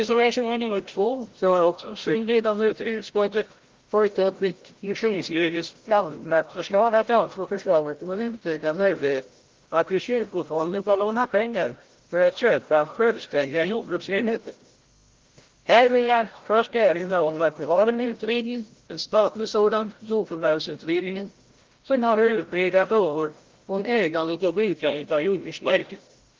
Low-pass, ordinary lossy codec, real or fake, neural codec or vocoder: 7.2 kHz; Opus, 16 kbps; fake; codec, 16 kHz, 0.5 kbps, FreqCodec, larger model